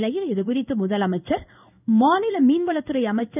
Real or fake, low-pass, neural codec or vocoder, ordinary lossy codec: fake; 3.6 kHz; codec, 16 kHz in and 24 kHz out, 1 kbps, XY-Tokenizer; none